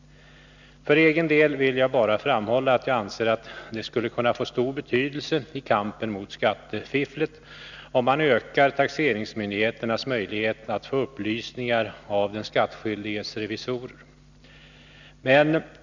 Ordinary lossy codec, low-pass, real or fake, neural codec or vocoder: none; 7.2 kHz; real; none